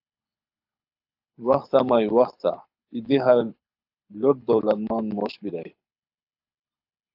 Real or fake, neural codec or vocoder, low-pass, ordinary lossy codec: fake; codec, 24 kHz, 6 kbps, HILCodec; 5.4 kHz; AAC, 48 kbps